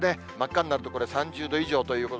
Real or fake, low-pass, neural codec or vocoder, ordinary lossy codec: real; none; none; none